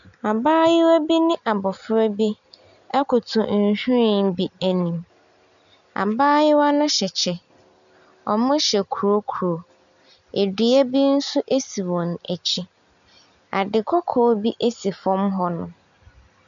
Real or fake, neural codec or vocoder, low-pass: real; none; 7.2 kHz